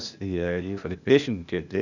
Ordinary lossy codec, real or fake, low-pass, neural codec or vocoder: none; fake; 7.2 kHz; codec, 16 kHz, 0.8 kbps, ZipCodec